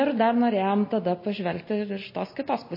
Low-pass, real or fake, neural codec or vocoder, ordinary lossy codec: 5.4 kHz; real; none; MP3, 24 kbps